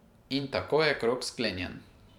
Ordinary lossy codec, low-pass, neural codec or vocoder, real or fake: none; 19.8 kHz; vocoder, 44.1 kHz, 128 mel bands every 512 samples, BigVGAN v2; fake